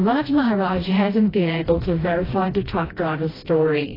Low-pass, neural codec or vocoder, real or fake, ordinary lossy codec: 5.4 kHz; codec, 16 kHz, 1 kbps, FreqCodec, smaller model; fake; AAC, 24 kbps